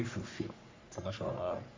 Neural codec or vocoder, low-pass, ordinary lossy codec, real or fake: codec, 44.1 kHz, 3.4 kbps, Pupu-Codec; 7.2 kHz; MP3, 64 kbps; fake